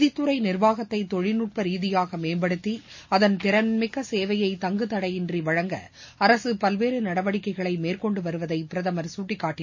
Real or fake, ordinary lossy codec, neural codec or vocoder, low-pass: real; MP3, 32 kbps; none; 7.2 kHz